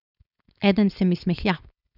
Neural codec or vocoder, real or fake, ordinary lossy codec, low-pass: codec, 16 kHz, 4.8 kbps, FACodec; fake; none; 5.4 kHz